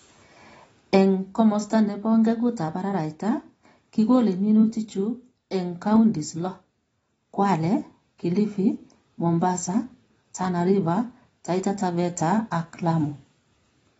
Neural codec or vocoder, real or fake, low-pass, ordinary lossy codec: none; real; 19.8 kHz; AAC, 24 kbps